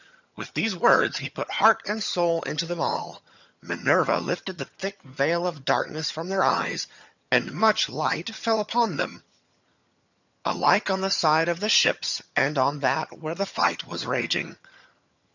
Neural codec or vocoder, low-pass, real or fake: vocoder, 22.05 kHz, 80 mel bands, HiFi-GAN; 7.2 kHz; fake